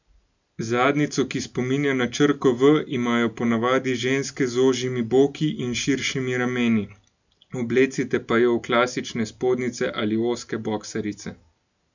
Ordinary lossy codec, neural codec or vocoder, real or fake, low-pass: none; none; real; 7.2 kHz